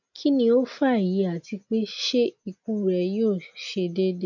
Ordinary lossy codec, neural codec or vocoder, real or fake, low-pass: none; none; real; 7.2 kHz